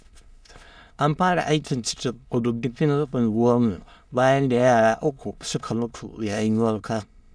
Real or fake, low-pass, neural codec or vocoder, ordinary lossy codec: fake; none; autoencoder, 22.05 kHz, a latent of 192 numbers a frame, VITS, trained on many speakers; none